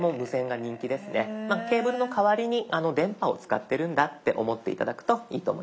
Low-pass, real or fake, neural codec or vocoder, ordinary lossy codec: none; real; none; none